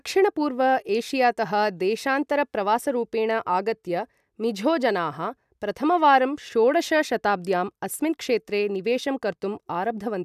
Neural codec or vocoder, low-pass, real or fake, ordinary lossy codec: none; 14.4 kHz; real; none